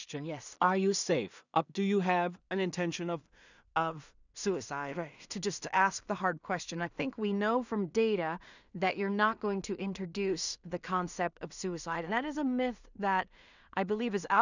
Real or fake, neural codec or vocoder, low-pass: fake; codec, 16 kHz in and 24 kHz out, 0.4 kbps, LongCat-Audio-Codec, two codebook decoder; 7.2 kHz